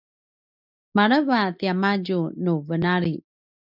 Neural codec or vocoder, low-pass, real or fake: none; 5.4 kHz; real